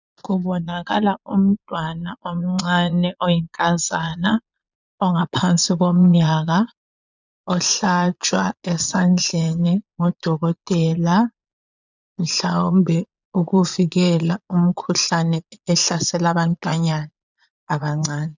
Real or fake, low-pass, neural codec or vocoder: fake; 7.2 kHz; vocoder, 22.05 kHz, 80 mel bands, Vocos